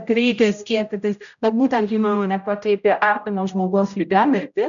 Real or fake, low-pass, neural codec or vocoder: fake; 7.2 kHz; codec, 16 kHz, 0.5 kbps, X-Codec, HuBERT features, trained on general audio